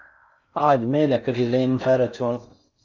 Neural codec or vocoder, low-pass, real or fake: codec, 16 kHz in and 24 kHz out, 0.6 kbps, FocalCodec, streaming, 4096 codes; 7.2 kHz; fake